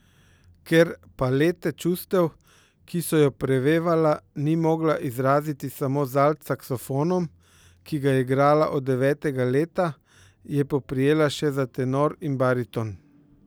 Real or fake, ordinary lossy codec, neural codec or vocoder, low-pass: real; none; none; none